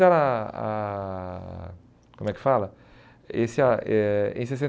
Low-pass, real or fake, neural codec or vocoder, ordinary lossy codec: none; real; none; none